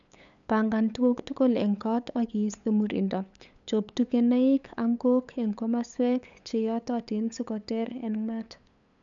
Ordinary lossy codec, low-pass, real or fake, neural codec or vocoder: none; 7.2 kHz; fake; codec, 16 kHz, 8 kbps, FunCodec, trained on LibriTTS, 25 frames a second